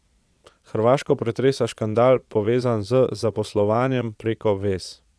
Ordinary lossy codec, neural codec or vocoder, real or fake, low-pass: none; vocoder, 22.05 kHz, 80 mel bands, Vocos; fake; none